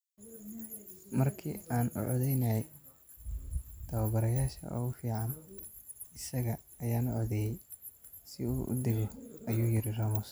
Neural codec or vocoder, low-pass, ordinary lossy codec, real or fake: none; none; none; real